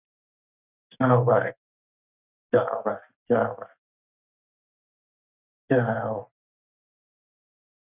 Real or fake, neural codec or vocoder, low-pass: real; none; 3.6 kHz